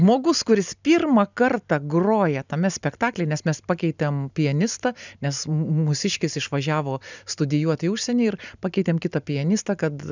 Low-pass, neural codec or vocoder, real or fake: 7.2 kHz; none; real